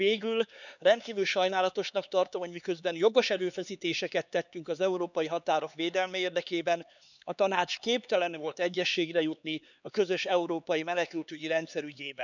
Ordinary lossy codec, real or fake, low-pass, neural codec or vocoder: none; fake; 7.2 kHz; codec, 16 kHz, 4 kbps, X-Codec, HuBERT features, trained on LibriSpeech